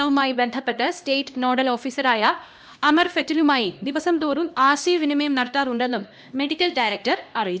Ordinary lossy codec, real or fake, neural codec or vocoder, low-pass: none; fake; codec, 16 kHz, 1 kbps, X-Codec, HuBERT features, trained on LibriSpeech; none